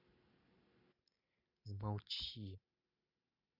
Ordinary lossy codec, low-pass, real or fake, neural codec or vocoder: none; 5.4 kHz; real; none